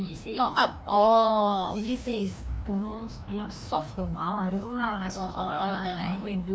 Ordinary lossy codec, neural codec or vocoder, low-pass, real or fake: none; codec, 16 kHz, 1 kbps, FreqCodec, larger model; none; fake